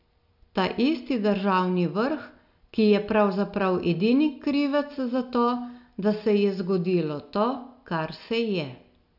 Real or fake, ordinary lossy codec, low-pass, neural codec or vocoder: real; none; 5.4 kHz; none